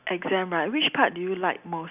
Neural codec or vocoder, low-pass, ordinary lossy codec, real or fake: none; 3.6 kHz; none; real